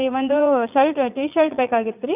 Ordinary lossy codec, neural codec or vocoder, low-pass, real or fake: none; vocoder, 44.1 kHz, 80 mel bands, Vocos; 3.6 kHz; fake